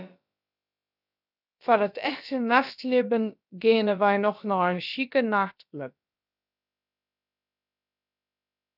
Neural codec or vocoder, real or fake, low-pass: codec, 16 kHz, about 1 kbps, DyCAST, with the encoder's durations; fake; 5.4 kHz